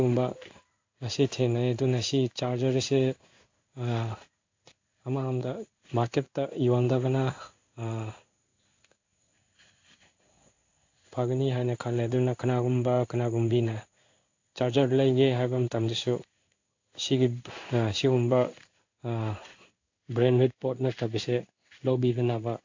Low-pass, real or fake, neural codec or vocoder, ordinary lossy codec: 7.2 kHz; fake; codec, 16 kHz in and 24 kHz out, 1 kbps, XY-Tokenizer; AAC, 48 kbps